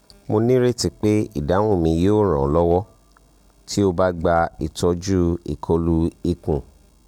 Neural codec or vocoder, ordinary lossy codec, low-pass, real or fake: none; none; 19.8 kHz; real